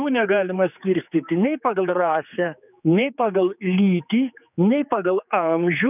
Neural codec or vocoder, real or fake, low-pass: codec, 16 kHz, 4 kbps, X-Codec, HuBERT features, trained on general audio; fake; 3.6 kHz